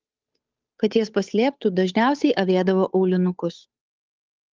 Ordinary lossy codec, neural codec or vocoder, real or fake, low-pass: Opus, 32 kbps; codec, 16 kHz, 8 kbps, FunCodec, trained on Chinese and English, 25 frames a second; fake; 7.2 kHz